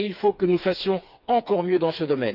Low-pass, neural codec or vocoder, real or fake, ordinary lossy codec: 5.4 kHz; codec, 16 kHz, 4 kbps, FreqCodec, smaller model; fake; none